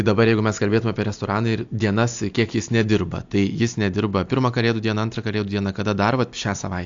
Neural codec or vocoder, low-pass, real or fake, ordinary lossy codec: none; 7.2 kHz; real; AAC, 64 kbps